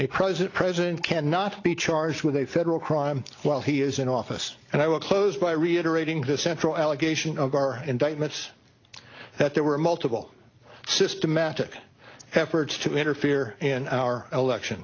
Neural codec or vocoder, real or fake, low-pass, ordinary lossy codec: none; real; 7.2 kHz; AAC, 32 kbps